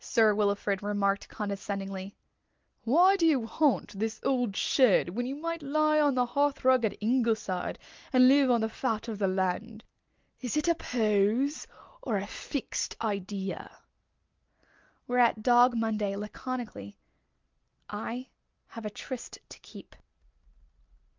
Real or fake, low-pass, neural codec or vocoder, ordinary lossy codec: real; 7.2 kHz; none; Opus, 24 kbps